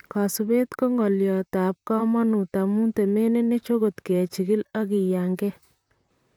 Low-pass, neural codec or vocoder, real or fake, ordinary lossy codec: 19.8 kHz; vocoder, 44.1 kHz, 128 mel bands, Pupu-Vocoder; fake; none